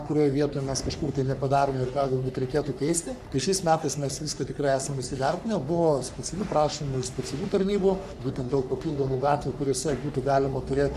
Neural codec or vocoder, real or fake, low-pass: codec, 44.1 kHz, 3.4 kbps, Pupu-Codec; fake; 14.4 kHz